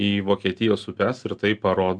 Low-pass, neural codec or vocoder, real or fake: 9.9 kHz; none; real